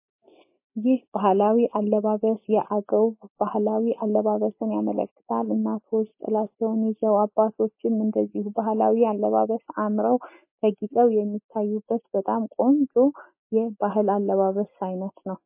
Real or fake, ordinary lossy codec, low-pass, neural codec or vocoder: real; MP3, 24 kbps; 3.6 kHz; none